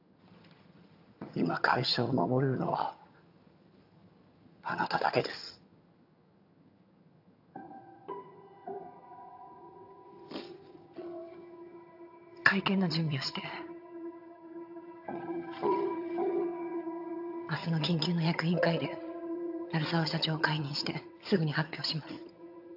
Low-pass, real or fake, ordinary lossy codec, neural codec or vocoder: 5.4 kHz; fake; AAC, 48 kbps; vocoder, 22.05 kHz, 80 mel bands, HiFi-GAN